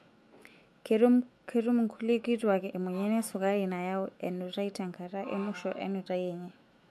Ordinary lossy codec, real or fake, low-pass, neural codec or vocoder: MP3, 64 kbps; fake; 14.4 kHz; autoencoder, 48 kHz, 128 numbers a frame, DAC-VAE, trained on Japanese speech